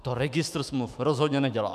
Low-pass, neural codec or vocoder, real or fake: 14.4 kHz; autoencoder, 48 kHz, 128 numbers a frame, DAC-VAE, trained on Japanese speech; fake